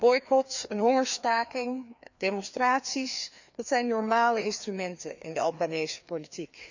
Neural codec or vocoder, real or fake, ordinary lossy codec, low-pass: codec, 16 kHz, 2 kbps, FreqCodec, larger model; fake; none; 7.2 kHz